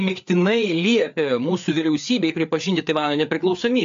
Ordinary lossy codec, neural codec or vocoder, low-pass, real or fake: AAC, 64 kbps; codec, 16 kHz, 4 kbps, FreqCodec, larger model; 7.2 kHz; fake